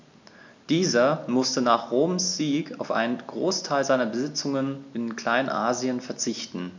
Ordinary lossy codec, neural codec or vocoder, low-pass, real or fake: MP3, 64 kbps; none; 7.2 kHz; real